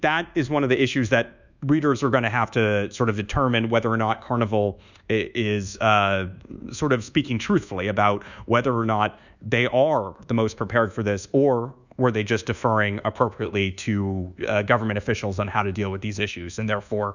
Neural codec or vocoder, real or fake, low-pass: codec, 24 kHz, 1.2 kbps, DualCodec; fake; 7.2 kHz